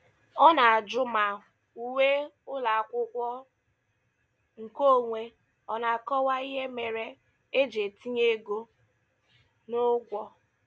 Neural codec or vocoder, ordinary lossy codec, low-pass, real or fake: none; none; none; real